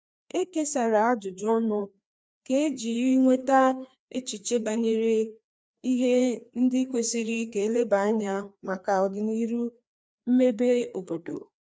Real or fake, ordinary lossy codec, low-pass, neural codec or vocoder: fake; none; none; codec, 16 kHz, 2 kbps, FreqCodec, larger model